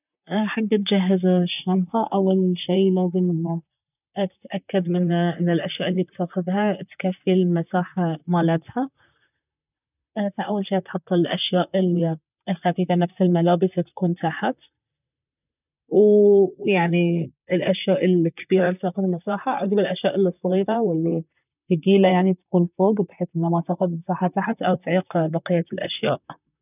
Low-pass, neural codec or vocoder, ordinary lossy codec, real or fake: 3.6 kHz; vocoder, 44.1 kHz, 128 mel bands, Pupu-Vocoder; none; fake